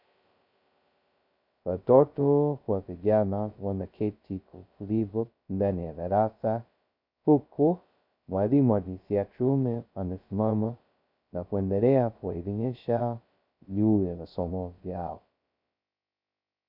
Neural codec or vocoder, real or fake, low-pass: codec, 16 kHz, 0.2 kbps, FocalCodec; fake; 5.4 kHz